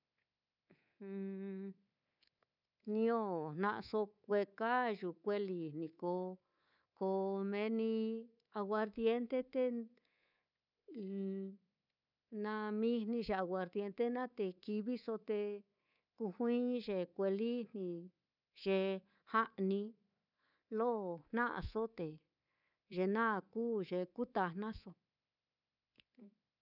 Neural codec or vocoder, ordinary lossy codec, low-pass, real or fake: codec, 24 kHz, 3.1 kbps, DualCodec; none; 5.4 kHz; fake